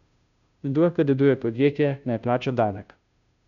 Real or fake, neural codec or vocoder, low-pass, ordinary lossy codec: fake; codec, 16 kHz, 0.5 kbps, FunCodec, trained on Chinese and English, 25 frames a second; 7.2 kHz; none